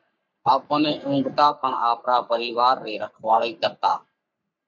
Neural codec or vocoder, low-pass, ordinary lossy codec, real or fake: codec, 44.1 kHz, 3.4 kbps, Pupu-Codec; 7.2 kHz; MP3, 64 kbps; fake